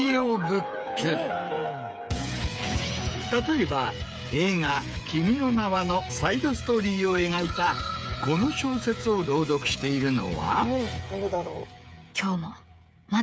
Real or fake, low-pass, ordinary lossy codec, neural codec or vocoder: fake; none; none; codec, 16 kHz, 8 kbps, FreqCodec, smaller model